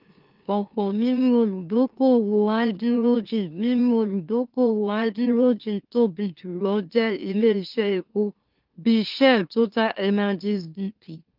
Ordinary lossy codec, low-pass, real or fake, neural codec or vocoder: Opus, 32 kbps; 5.4 kHz; fake; autoencoder, 44.1 kHz, a latent of 192 numbers a frame, MeloTTS